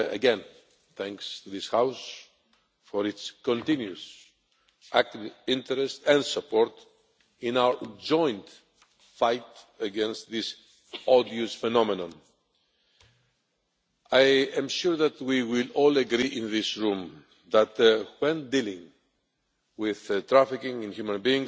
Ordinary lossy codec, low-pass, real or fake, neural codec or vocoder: none; none; real; none